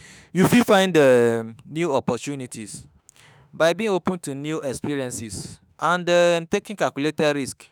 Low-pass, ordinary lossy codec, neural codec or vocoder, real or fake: none; none; autoencoder, 48 kHz, 32 numbers a frame, DAC-VAE, trained on Japanese speech; fake